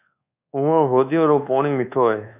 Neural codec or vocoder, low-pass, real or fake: codec, 24 kHz, 1.2 kbps, DualCodec; 3.6 kHz; fake